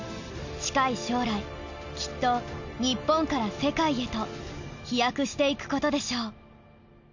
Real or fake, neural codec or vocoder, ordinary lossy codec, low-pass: real; none; none; 7.2 kHz